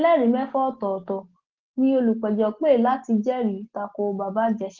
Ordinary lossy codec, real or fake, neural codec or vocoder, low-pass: Opus, 16 kbps; real; none; 7.2 kHz